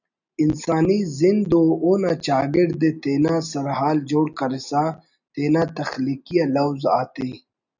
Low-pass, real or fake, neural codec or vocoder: 7.2 kHz; real; none